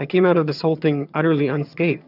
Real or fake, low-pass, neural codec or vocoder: fake; 5.4 kHz; vocoder, 22.05 kHz, 80 mel bands, HiFi-GAN